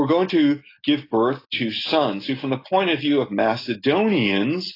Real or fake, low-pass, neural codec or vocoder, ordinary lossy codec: real; 5.4 kHz; none; AAC, 24 kbps